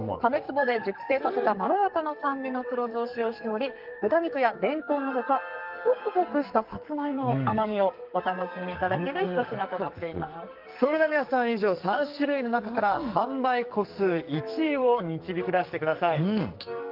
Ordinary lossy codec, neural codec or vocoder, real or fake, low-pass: Opus, 24 kbps; codec, 44.1 kHz, 2.6 kbps, SNAC; fake; 5.4 kHz